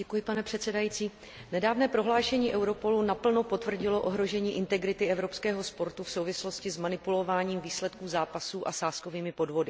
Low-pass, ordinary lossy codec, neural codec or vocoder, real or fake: none; none; none; real